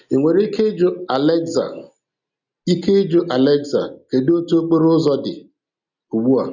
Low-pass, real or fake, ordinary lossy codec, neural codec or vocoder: 7.2 kHz; real; none; none